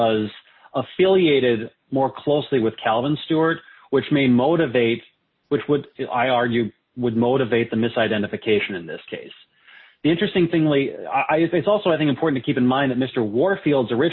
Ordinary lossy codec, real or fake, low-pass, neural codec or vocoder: MP3, 24 kbps; real; 7.2 kHz; none